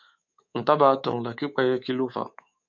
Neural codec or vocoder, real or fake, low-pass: codec, 24 kHz, 3.1 kbps, DualCodec; fake; 7.2 kHz